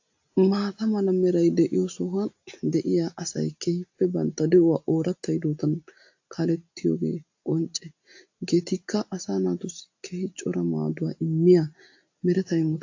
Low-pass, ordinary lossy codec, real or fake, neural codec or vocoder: 7.2 kHz; AAC, 48 kbps; real; none